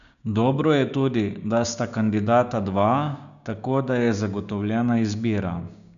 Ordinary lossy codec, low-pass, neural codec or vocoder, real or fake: MP3, 96 kbps; 7.2 kHz; codec, 16 kHz, 6 kbps, DAC; fake